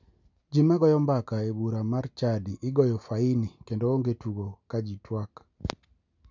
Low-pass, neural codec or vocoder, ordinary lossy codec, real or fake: 7.2 kHz; none; none; real